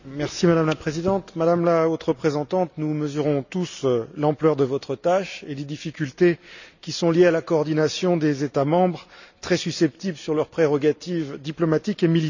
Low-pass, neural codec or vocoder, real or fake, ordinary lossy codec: 7.2 kHz; none; real; none